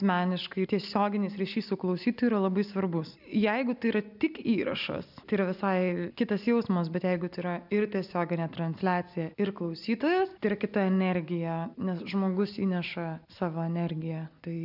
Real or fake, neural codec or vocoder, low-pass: real; none; 5.4 kHz